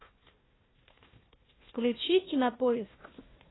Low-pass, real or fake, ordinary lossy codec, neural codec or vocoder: 7.2 kHz; fake; AAC, 16 kbps; codec, 16 kHz, 1 kbps, FunCodec, trained on Chinese and English, 50 frames a second